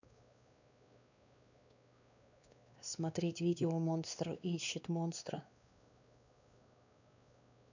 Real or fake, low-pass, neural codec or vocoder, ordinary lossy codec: fake; 7.2 kHz; codec, 16 kHz, 2 kbps, X-Codec, WavLM features, trained on Multilingual LibriSpeech; none